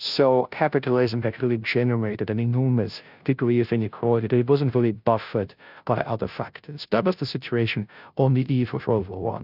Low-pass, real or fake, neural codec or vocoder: 5.4 kHz; fake; codec, 16 kHz, 0.5 kbps, FunCodec, trained on Chinese and English, 25 frames a second